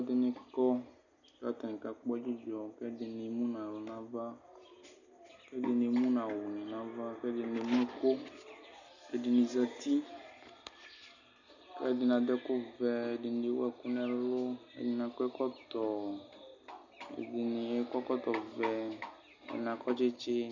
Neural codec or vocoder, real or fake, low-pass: none; real; 7.2 kHz